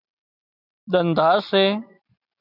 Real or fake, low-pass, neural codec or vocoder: real; 5.4 kHz; none